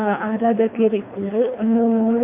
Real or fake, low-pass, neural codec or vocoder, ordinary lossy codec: fake; 3.6 kHz; codec, 24 kHz, 3 kbps, HILCodec; MP3, 32 kbps